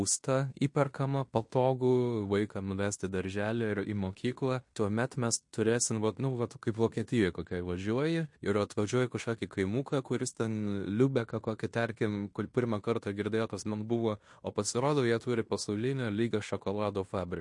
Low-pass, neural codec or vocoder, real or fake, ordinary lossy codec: 10.8 kHz; codec, 16 kHz in and 24 kHz out, 0.9 kbps, LongCat-Audio-Codec, fine tuned four codebook decoder; fake; MP3, 48 kbps